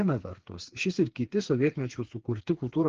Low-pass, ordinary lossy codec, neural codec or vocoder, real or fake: 7.2 kHz; Opus, 16 kbps; codec, 16 kHz, 4 kbps, FreqCodec, smaller model; fake